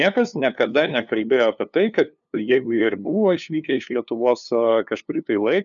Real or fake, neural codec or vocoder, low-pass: fake; codec, 16 kHz, 2 kbps, FunCodec, trained on LibriTTS, 25 frames a second; 7.2 kHz